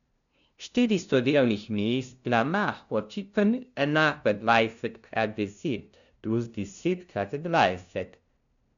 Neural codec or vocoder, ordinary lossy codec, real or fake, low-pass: codec, 16 kHz, 0.5 kbps, FunCodec, trained on LibriTTS, 25 frames a second; none; fake; 7.2 kHz